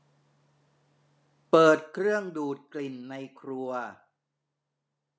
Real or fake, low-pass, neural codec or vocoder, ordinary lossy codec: real; none; none; none